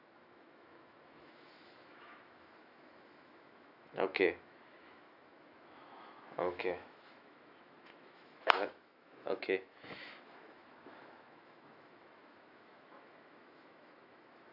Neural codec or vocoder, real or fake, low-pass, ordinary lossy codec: autoencoder, 48 kHz, 128 numbers a frame, DAC-VAE, trained on Japanese speech; fake; 5.4 kHz; none